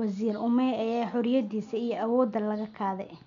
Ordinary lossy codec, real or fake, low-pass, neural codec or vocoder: MP3, 96 kbps; real; 7.2 kHz; none